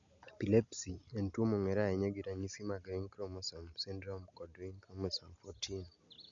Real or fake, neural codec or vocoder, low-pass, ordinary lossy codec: real; none; 7.2 kHz; none